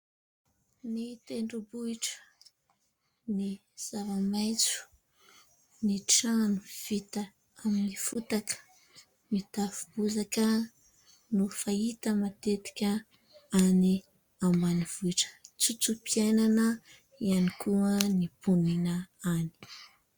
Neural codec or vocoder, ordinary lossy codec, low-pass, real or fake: none; Opus, 64 kbps; 19.8 kHz; real